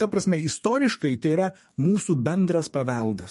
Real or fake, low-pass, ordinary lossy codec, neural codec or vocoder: fake; 14.4 kHz; MP3, 48 kbps; codec, 32 kHz, 1.9 kbps, SNAC